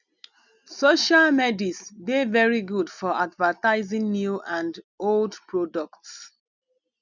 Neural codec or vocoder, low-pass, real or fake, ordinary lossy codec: none; 7.2 kHz; real; none